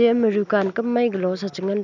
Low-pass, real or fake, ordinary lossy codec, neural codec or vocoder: 7.2 kHz; real; none; none